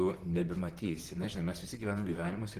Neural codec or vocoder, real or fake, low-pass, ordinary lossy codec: vocoder, 44.1 kHz, 128 mel bands, Pupu-Vocoder; fake; 14.4 kHz; Opus, 16 kbps